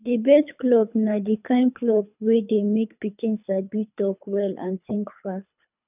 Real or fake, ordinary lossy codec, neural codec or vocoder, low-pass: fake; none; codec, 24 kHz, 3 kbps, HILCodec; 3.6 kHz